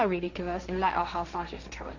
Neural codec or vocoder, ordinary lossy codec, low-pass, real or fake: codec, 16 kHz, 1.1 kbps, Voila-Tokenizer; none; 7.2 kHz; fake